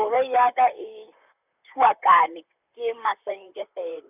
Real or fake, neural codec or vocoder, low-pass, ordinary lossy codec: fake; vocoder, 44.1 kHz, 128 mel bands, Pupu-Vocoder; 3.6 kHz; none